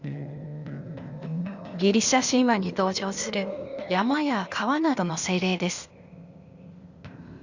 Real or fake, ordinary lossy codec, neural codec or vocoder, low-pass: fake; Opus, 64 kbps; codec, 16 kHz, 0.8 kbps, ZipCodec; 7.2 kHz